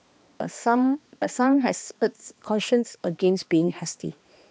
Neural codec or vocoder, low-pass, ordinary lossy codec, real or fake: codec, 16 kHz, 2 kbps, X-Codec, HuBERT features, trained on balanced general audio; none; none; fake